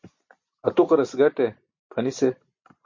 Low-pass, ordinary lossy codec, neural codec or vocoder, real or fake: 7.2 kHz; MP3, 48 kbps; none; real